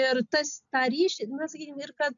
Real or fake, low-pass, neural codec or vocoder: real; 7.2 kHz; none